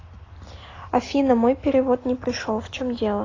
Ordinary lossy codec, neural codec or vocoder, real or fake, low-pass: AAC, 32 kbps; none; real; 7.2 kHz